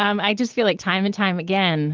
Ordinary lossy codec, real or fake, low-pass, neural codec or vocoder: Opus, 16 kbps; real; 7.2 kHz; none